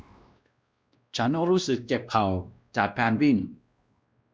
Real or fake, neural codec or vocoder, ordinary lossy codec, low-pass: fake; codec, 16 kHz, 1 kbps, X-Codec, WavLM features, trained on Multilingual LibriSpeech; none; none